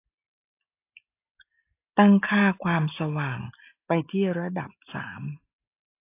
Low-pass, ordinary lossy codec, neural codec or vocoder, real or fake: 3.6 kHz; AAC, 24 kbps; none; real